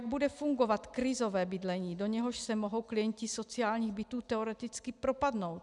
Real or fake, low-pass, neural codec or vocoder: real; 10.8 kHz; none